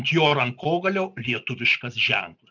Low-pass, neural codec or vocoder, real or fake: 7.2 kHz; none; real